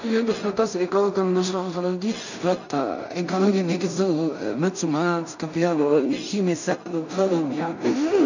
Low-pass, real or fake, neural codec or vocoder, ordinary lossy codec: 7.2 kHz; fake; codec, 16 kHz in and 24 kHz out, 0.4 kbps, LongCat-Audio-Codec, two codebook decoder; none